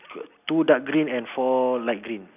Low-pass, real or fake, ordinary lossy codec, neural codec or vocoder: 3.6 kHz; real; none; none